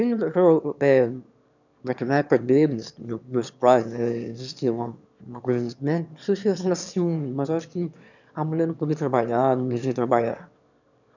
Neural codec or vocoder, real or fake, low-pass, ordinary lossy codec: autoencoder, 22.05 kHz, a latent of 192 numbers a frame, VITS, trained on one speaker; fake; 7.2 kHz; none